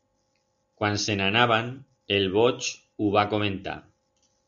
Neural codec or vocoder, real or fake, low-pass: none; real; 7.2 kHz